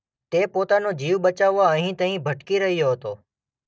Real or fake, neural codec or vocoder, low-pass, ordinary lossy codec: real; none; none; none